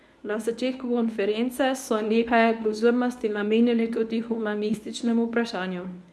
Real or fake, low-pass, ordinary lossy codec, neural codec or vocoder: fake; none; none; codec, 24 kHz, 0.9 kbps, WavTokenizer, medium speech release version 1